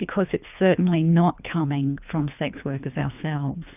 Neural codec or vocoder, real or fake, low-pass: codec, 24 kHz, 3 kbps, HILCodec; fake; 3.6 kHz